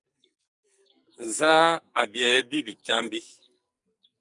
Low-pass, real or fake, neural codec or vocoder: 10.8 kHz; fake; codec, 44.1 kHz, 2.6 kbps, SNAC